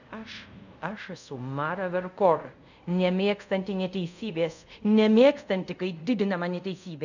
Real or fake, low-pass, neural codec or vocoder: fake; 7.2 kHz; codec, 24 kHz, 0.5 kbps, DualCodec